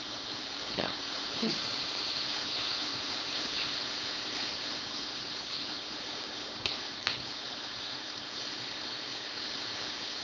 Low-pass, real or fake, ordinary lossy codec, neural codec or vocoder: none; fake; none; codec, 16 kHz, 4.8 kbps, FACodec